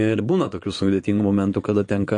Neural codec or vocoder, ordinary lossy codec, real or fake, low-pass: vocoder, 22.05 kHz, 80 mel bands, Vocos; MP3, 48 kbps; fake; 9.9 kHz